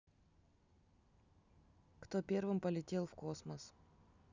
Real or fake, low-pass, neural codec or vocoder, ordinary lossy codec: real; 7.2 kHz; none; none